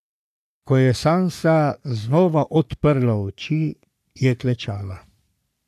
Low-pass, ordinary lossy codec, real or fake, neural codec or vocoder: 14.4 kHz; none; fake; codec, 44.1 kHz, 3.4 kbps, Pupu-Codec